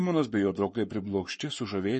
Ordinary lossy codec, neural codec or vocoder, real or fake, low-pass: MP3, 32 kbps; codec, 44.1 kHz, 7.8 kbps, Pupu-Codec; fake; 10.8 kHz